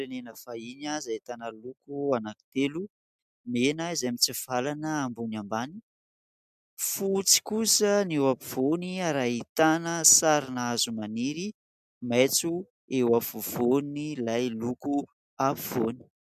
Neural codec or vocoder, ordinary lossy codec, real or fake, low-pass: none; MP3, 96 kbps; real; 14.4 kHz